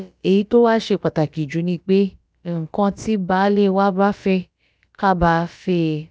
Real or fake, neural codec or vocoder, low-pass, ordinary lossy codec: fake; codec, 16 kHz, about 1 kbps, DyCAST, with the encoder's durations; none; none